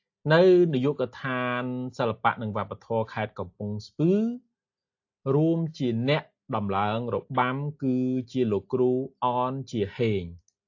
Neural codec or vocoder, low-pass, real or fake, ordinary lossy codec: none; 7.2 kHz; real; AAC, 48 kbps